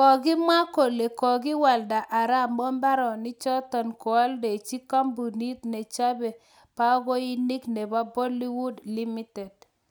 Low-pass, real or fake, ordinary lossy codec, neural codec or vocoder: none; real; none; none